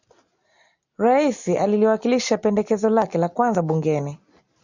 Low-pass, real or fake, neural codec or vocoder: 7.2 kHz; real; none